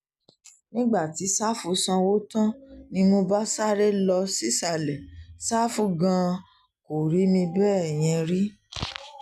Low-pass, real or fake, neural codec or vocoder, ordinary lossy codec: 14.4 kHz; real; none; none